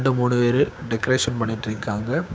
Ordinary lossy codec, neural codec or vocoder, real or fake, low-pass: none; codec, 16 kHz, 6 kbps, DAC; fake; none